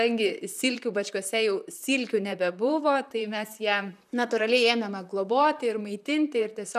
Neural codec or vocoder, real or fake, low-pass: vocoder, 44.1 kHz, 128 mel bands, Pupu-Vocoder; fake; 14.4 kHz